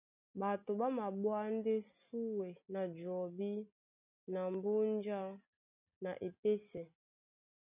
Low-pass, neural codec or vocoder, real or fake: 3.6 kHz; none; real